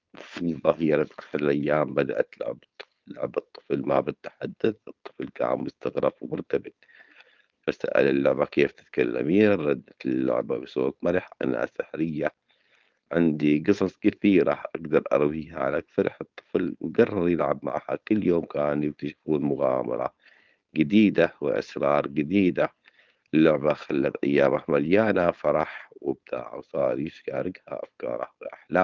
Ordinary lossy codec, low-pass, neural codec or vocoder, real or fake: Opus, 32 kbps; 7.2 kHz; codec, 16 kHz, 4.8 kbps, FACodec; fake